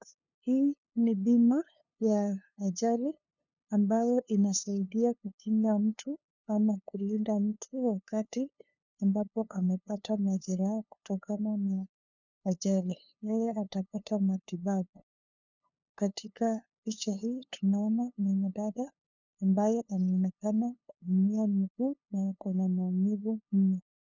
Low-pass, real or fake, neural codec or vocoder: 7.2 kHz; fake; codec, 16 kHz, 2 kbps, FunCodec, trained on LibriTTS, 25 frames a second